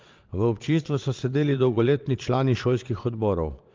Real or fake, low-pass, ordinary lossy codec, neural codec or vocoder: fake; 7.2 kHz; Opus, 32 kbps; vocoder, 22.05 kHz, 80 mel bands, Vocos